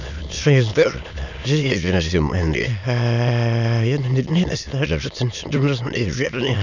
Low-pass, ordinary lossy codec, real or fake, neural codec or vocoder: 7.2 kHz; none; fake; autoencoder, 22.05 kHz, a latent of 192 numbers a frame, VITS, trained on many speakers